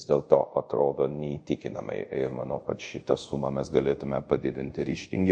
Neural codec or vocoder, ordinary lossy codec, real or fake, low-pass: codec, 24 kHz, 0.5 kbps, DualCodec; MP3, 48 kbps; fake; 9.9 kHz